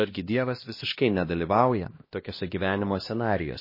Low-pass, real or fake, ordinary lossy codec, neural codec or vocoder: 5.4 kHz; fake; MP3, 24 kbps; codec, 16 kHz, 1 kbps, X-Codec, HuBERT features, trained on LibriSpeech